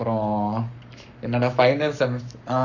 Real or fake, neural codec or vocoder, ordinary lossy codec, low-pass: fake; codec, 44.1 kHz, 7.8 kbps, Pupu-Codec; none; 7.2 kHz